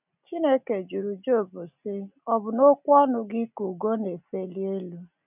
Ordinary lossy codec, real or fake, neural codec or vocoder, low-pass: none; real; none; 3.6 kHz